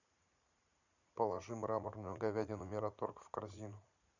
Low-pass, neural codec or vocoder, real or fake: 7.2 kHz; vocoder, 44.1 kHz, 80 mel bands, Vocos; fake